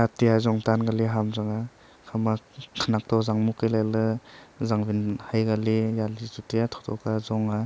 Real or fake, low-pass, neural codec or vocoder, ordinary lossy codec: real; none; none; none